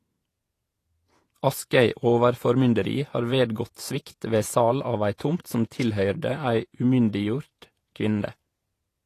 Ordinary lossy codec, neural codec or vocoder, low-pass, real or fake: AAC, 48 kbps; vocoder, 48 kHz, 128 mel bands, Vocos; 14.4 kHz; fake